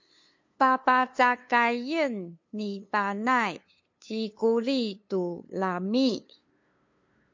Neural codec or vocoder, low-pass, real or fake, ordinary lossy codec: codec, 16 kHz, 2 kbps, FunCodec, trained on LibriTTS, 25 frames a second; 7.2 kHz; fake; MP3, 48 kbps